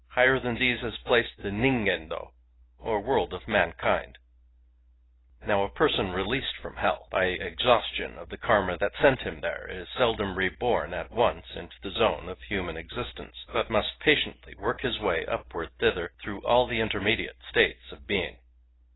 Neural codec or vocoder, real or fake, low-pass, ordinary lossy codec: none; real; 7.2 kHz; AAC, 16 kbps